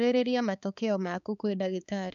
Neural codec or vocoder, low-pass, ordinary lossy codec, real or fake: codec, 16 kHz, 4 kbps, X-Codec, HuBERT features, trained on balanced general audio; 7.2 kHz; none; fake